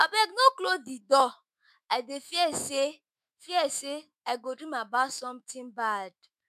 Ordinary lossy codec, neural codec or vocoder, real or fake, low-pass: MP3, 96 kbps; autoencoder, 48 kHz, 128 numbers a frame, DAC-VAE, trained on Japanese speech; fake; 14.4 kHz